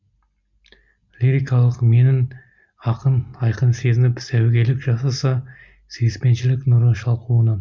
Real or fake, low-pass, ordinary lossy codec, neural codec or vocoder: real; 7.2 kHz; MP3, 64 kbps; none